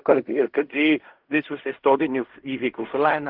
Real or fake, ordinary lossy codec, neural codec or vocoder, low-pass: fake; Opus, 24 kbps; codec, 16 kHz in and 24 kHz out, 0.4 kbps, LongCat-Audio-Codec, fine tuned four codebook decoder; 5.4 kHz